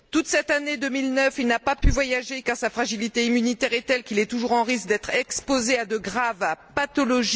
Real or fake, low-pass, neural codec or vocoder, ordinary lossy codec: real; none; none; none